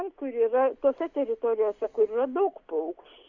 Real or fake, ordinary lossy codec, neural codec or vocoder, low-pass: real; Opus, 64 kbps; none; 7.2 kHz